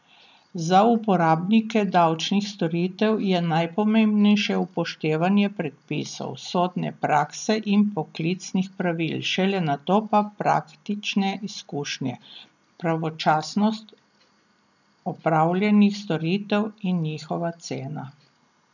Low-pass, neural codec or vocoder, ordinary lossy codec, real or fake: 7.2 kHz; none; none; real